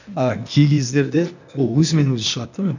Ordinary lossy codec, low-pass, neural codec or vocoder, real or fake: none; 7.2 kHz; codec, 16 kHz, 0.8 kbps, ZipCodec; fake